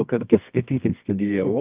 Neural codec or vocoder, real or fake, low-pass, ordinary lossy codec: codec, 24 kHz, 0.9 kbps, WavTokenizer, medium music audio release; fake; 3.6 kHz; Opus, 24 kbps